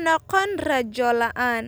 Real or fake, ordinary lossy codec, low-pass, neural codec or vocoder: real; none; none; none